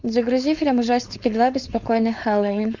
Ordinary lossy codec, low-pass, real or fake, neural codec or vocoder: Opus, 64 kbps; 7.2 kHz; fake; codec, 16 kHz, 4.8 kbps, FACodec